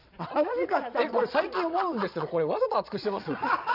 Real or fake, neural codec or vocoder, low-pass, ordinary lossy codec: fake; vocoder, 22.05 kHz, 80 mel bands, Vocos; 5.4 kHz; none